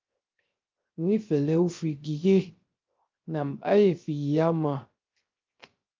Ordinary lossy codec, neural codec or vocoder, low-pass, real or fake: Opus, 32 kbps; codec, 16 kHz, 0.3 kbps, FocalCodec; 7.2 kHz; fake